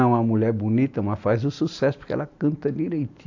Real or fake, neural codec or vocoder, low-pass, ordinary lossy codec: real; none; 7.2 kHz; AAC, 48 kbps